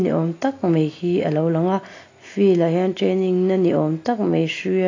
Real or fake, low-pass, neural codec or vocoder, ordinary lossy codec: real; 7.2 kHz; none; AAC, 32 kbps